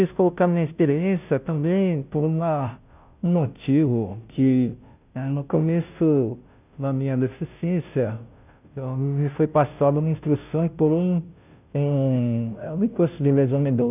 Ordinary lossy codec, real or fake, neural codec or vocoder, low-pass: none; fake; codec, 16 kHz, 0.5 kbps, FunCodec, trained on Chinese and English, 25 frames a second; 3.6 kHz